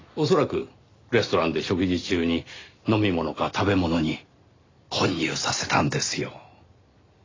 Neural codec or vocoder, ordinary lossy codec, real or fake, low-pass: none; AAC, 32 kbps; real; 7.2 kHz